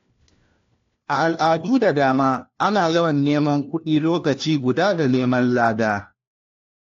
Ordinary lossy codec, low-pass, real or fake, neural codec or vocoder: AAC, 48 kbps; 7.2 kHz; fake; codec, 16 kHz, 1 kbps, FunCodec, trained on LibriTTS, 50 frames a second